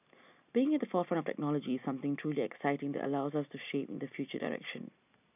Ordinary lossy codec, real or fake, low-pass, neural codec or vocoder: none; real; 3.6 kHz; none